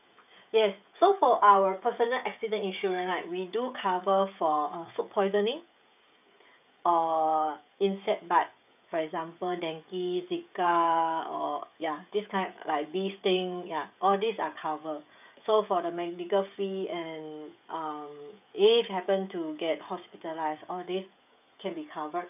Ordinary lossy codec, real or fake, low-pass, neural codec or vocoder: none; fake; 3.6 kHz; codec, 16 kHz, 16 kbps, FreqCodec, smaller model